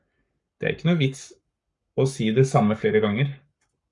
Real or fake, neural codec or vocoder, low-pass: fake; codec, 44.1 kHz, 7.8 kbps, Pupu-Codec; 10.8 kHz